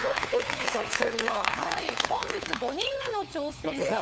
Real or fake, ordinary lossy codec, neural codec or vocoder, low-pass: fake; none; codec, 16 kHz, 4 kbps, FunCodec, trained on LibriTTS, 50 frames a second; none